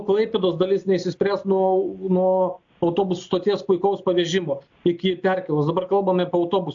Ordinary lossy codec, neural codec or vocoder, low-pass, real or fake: AAC, 64 kbps; none; 7.2 kHz; real